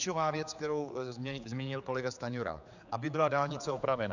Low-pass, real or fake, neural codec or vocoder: 7.2 kHz; fake; codec, 16 kHz, 4 kbps, X-Codec, HuBERT features, trained on general audio